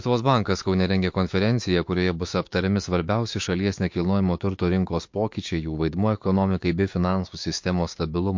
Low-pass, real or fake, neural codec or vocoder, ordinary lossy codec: 7.2 kHz; fake; codec, 16 kHz, 6 kbps, DAC; MP3, 48 kbps